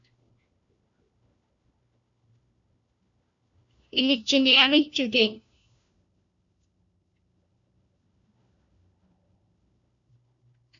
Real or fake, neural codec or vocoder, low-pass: fake; codec, 16 kHz, 1 kbps, FunCodec, trained on LibriTTS, 50 frames a second; 7.2 kHz